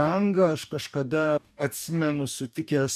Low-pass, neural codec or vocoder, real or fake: 14.4 kHz; codec, 44.1 kHz, 2.6 kbps, DAC; fake